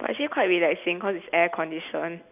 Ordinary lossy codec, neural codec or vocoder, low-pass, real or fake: none; none; 3.6 kHz; real